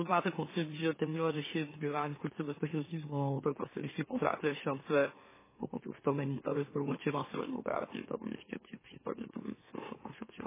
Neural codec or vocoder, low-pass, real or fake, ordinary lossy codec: autoencoder, 44.1 kHz, a latent of 192 numbers a frame, MeloTTS; 3.6 kHz; fake; MP3, 16 kbps